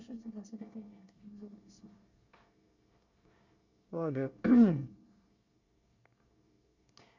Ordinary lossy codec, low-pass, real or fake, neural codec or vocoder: none; 7.2 kHz; fake; codec, 24 kHz, 1 kbps, SNAC